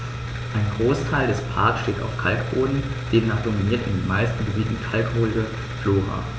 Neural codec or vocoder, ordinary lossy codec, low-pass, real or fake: none; none; none; real